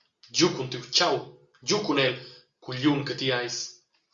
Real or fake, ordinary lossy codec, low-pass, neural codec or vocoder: real; Opus, 64 kbps; 7.2 kHz; none